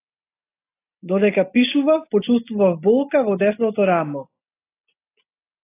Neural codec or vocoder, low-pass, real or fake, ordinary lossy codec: none; 3.6 kHz; real; AAC, 24 kbps